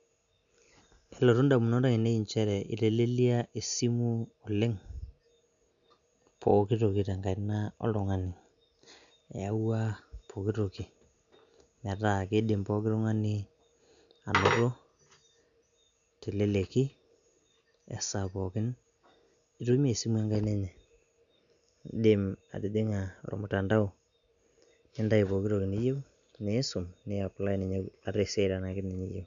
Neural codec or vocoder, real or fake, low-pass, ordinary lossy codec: none; real; 7.2 kHz; none